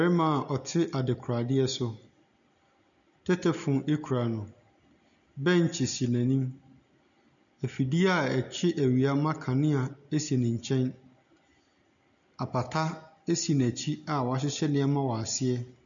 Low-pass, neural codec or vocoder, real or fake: 7.2 kHz; none; real